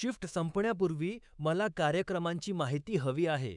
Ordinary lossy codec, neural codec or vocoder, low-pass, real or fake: none; codec, 24 kHz, 3.1 kbps, DualCodec; 10.8 kHz; fake